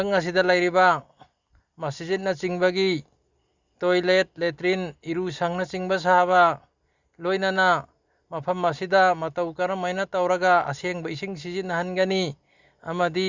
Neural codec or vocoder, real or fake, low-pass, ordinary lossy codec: none; real; 7.2 kHz; Opus, 64 kbps